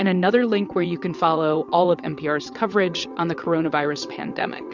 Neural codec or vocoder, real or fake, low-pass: vocoder, 22.05 kHz, 80 mel bands, WaveNeXt; fake; 7.2 kHz